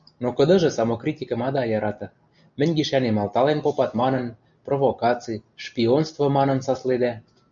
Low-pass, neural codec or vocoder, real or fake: 7.2 kHz; none; real